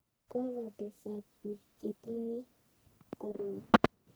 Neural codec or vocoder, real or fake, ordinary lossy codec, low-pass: codec, 44.1 kHz, 1.7 kbps, Pupu-Codec; fake; none; none